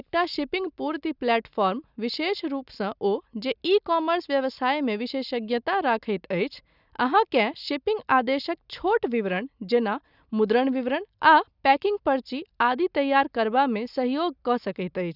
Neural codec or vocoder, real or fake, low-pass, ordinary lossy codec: none; real; 5.4 kHz; none